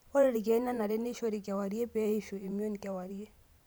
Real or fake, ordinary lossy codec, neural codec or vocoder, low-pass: fake; none; vocoder, 44.1 kHz, 128 mel bands every 512 samples, BigVGAN v2; none